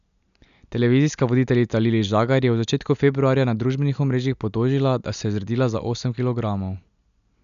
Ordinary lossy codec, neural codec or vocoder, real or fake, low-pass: none; none; real; 7.2 kHz